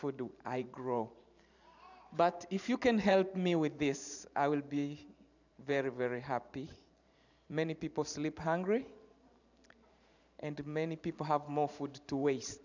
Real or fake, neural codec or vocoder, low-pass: fake; vocoder, 44.1 kHz, 128 mel bands every 512 samples, BigVGAN v2; 7.2 kHz